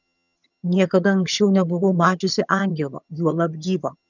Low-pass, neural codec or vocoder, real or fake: 7.2 kHz; vocoder, 22.05 kHz, 80 mel bands, HiFi-GAN; fake